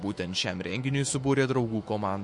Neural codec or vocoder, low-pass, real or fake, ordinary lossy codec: autoencoder, 48 kHz, 128 numbers a frame, DAC-VAE, trained on Japanese speech; 10.8 kHz; fake; MP3, 48 kbps